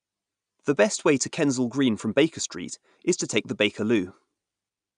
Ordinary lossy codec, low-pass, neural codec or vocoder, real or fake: none; 9.9 kHz; none; real